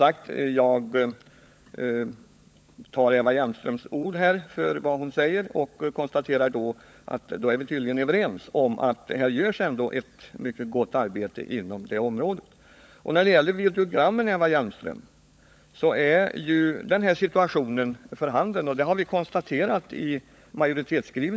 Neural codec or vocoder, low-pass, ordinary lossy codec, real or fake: codec, 16 kHz, 16 kbps, FunCodec, trained on LibriTTS, 50 frames a second; none; none; fake